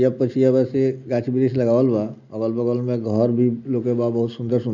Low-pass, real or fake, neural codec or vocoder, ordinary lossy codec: 7.2 kHz; real; none; none